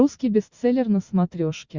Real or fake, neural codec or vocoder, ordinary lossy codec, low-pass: real; none; Opus, 64 kbps; 7.2 kHz